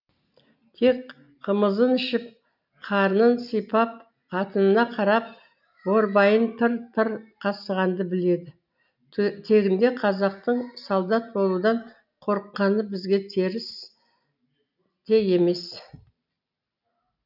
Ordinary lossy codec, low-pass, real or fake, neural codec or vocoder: none; 5.4 kHz; real; none